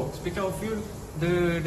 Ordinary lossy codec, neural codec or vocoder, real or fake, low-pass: AAC, 32 kbps; none; real; 19.8 kHz